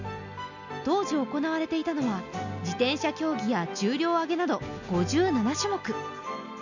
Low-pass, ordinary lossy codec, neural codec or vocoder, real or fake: 7.2 kHz; none; none; real